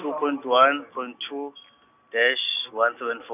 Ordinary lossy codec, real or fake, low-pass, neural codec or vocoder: none; real; 3.6 kHz; none